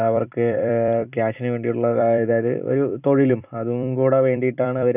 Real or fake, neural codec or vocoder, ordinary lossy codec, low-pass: fake; vocoder, 44.1 kHz, 128 mel bands every 256 samples, BigVGAN v2; none; 3.6 kHz